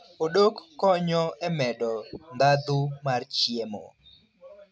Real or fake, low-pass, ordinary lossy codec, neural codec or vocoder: real; none; none; none